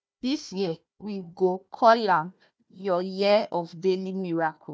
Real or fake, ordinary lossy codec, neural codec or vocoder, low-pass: fake; none; codec, 16 kHz, 1 kbps, FunCodec, trained on Chinese and English, 50 frames a second; none